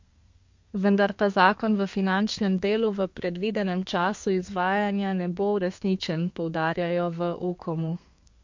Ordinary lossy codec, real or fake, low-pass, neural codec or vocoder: MP3, 48 kbps; fake; 7.2 kHz; codec, 32 kHz, 1.9 kbps, SNAC